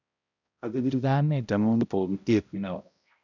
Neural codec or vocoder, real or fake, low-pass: codec, 16 kHz, 0.5 kbps, X-Codec, HuBERT features, trained on balanced general audio; fake; 7.2 kHz